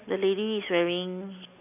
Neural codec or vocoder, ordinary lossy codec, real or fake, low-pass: none; none; real; 3.6 kHz